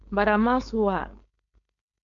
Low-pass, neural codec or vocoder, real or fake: 7.2 kHz; codec, 16 kHz, 4.8 kbps, FACodec; fake